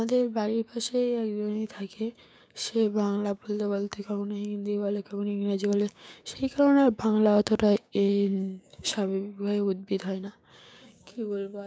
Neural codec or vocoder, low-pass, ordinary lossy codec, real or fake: codec, 16 kHz, 6 kbps, DAC; none; none; fake